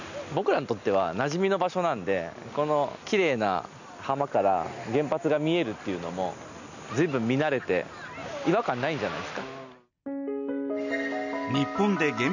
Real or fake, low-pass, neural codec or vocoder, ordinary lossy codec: real; 7.2 kHz; none; none